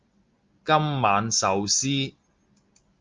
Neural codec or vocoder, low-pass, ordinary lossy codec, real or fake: none; 7.2 kHz; Opus, 32 kbps; real